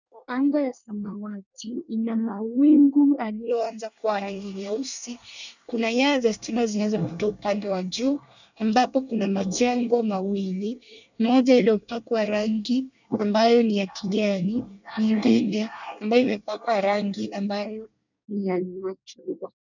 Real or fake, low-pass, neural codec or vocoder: fake; 7.2 kHz; codec, 24 kHz, 1 kbps, SNAC